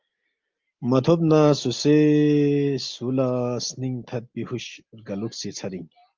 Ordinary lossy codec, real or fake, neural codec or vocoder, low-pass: Opus, 32 kbps; real; none; 7.2 kHz